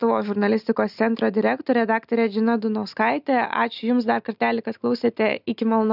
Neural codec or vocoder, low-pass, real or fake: none; 5.4 kHz; real